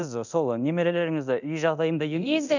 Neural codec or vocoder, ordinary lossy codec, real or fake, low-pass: codec, 24 kHz, 0.9 kbps, DualCodec; none; fake; 7.2 kHz